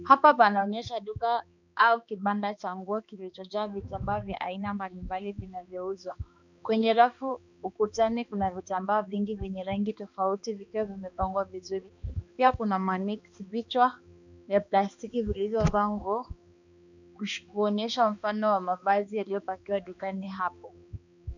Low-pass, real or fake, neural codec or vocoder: 7.2 kHz; fake; codec, 16 kHz, 2 kbps, X-Codec, HuBERT features, trained on balanced general audio